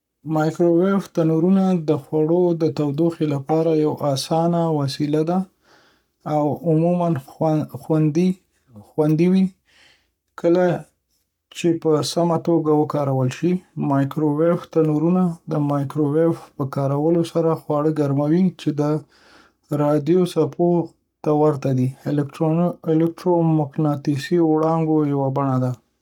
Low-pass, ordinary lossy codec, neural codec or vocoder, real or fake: 19.8 kHz; none; codec, 44.1 kHz, 7.8 kbps, Pupu-Codec; fake